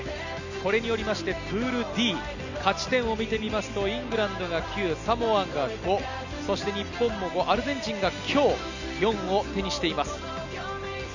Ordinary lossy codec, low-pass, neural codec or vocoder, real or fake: none; 7.2 kHz; none; real